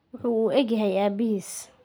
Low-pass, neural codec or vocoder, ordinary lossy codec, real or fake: none; none; none; real